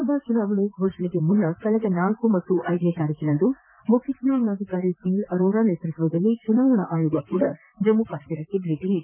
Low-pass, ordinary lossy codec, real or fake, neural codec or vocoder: 3.6 kHz; none; fake; vocoder, 44.1 kHz, 128 mel bands, Pupu-Vocoder